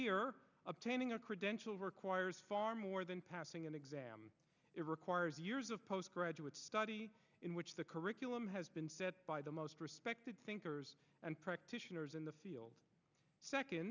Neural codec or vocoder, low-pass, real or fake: none; 7.2 kHz; real